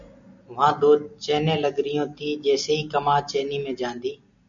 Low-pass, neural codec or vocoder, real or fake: 7.2 kHz; none; real